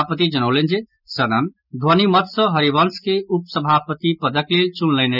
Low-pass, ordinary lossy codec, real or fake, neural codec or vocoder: 5.4 kHz; none; real; none